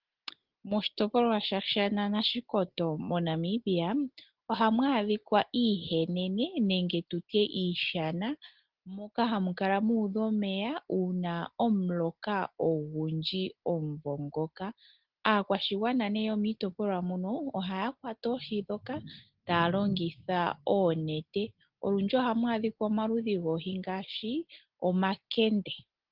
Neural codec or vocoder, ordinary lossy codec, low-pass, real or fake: none; Opus, 16 kbps; 5.4 kHz; real